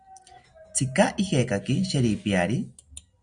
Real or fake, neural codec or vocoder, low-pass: real; none; 9.9 kHz